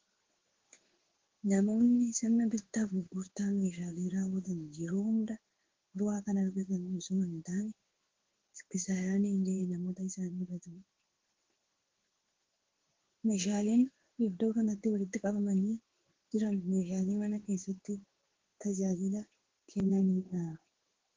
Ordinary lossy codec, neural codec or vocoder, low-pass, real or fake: Opus, 16 kbps; codec, 16 kHz in and 24 kHz out, 1 kbps, XY-Tokenizer; 7.2 kHz; fake